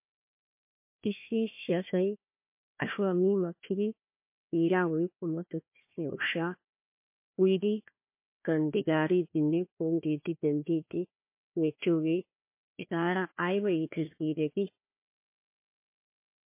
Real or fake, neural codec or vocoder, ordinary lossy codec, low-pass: fake; codec, 16 kHz, 1 kbps, FunCodec, trained on Chinese and English, 50 frames a second; MP3, 24 kbps; 3.6 kHz